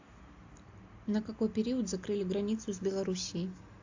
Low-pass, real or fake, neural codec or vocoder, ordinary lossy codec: 7.2 kHz; real; none; AAC, 48 kbps